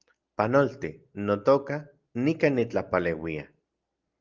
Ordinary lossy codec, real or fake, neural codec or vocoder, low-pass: Opus, 32 kbps; real; none; 7.2 kHz